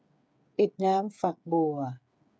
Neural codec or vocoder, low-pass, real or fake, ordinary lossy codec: codec, 16 kHz, 8 kbps, FreqCodec, smaller model; none; fake; none